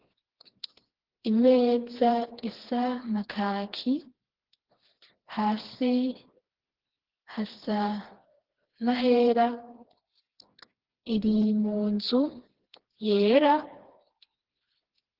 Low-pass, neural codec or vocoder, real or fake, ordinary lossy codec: 5.4 kHz; codec, 16 kHz, 2 kbps, FreqCodec, smaller model; fake; Opus, 16 kbps